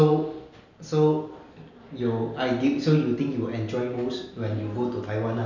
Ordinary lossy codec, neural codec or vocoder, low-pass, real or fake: none; none; 7.2 kHz; real